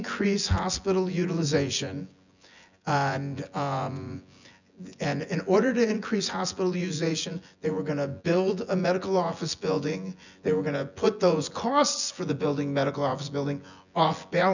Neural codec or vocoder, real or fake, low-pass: vocoder, 24 kHz, 100 mel bands, Vocos; fake; 7.2 kHz